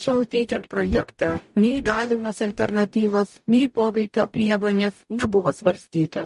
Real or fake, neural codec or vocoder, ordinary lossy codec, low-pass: fake; codec, 44.1 kHz, 0.9 kbps, DAC; MP3, 48 kbps; 14.4 kHz